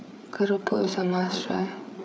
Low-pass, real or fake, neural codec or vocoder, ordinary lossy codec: none; fake; codec, 16 kHz, 8 kbps, FreqCodec, larger model; none